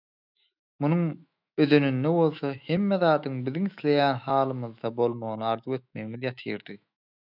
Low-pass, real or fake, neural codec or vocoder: 5.4 kHz; fake; autoencoder, 48 kHz, 128 numbers a frame, DAC-VAE, trained on Japanese speech